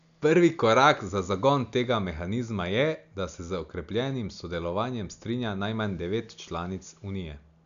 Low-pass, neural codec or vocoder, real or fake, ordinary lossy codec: 7.2 kHz; none; real; none